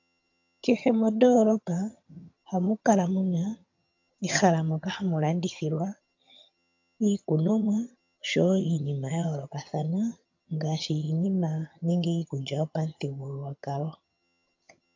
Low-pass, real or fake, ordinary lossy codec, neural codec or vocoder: 7.2 kHz; fake; MP3, 64 kbps; vocoder, 22.05 kHz, 80 mel bands, HiFi-GAN